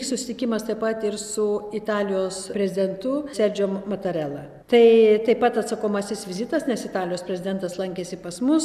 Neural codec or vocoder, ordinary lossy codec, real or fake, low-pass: none; AAC, 96 kbps; real; 14.4 kHz